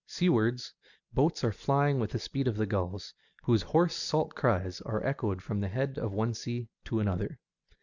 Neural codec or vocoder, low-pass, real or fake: none; 7.2 kHz; real